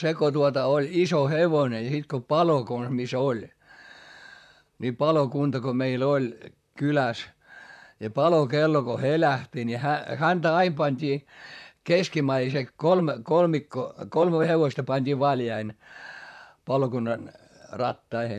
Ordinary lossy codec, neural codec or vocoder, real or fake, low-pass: none; vocoder, 44.1 kHz, 128 mel bands, Pupu-Vocoder; fake; 14.4 kHz